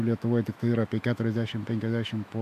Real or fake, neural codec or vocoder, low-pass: fake; autoencoder, 48 kHz, 128 numbers a frame, DAC-VAE, trained on Japanese speech; 14.4 kHz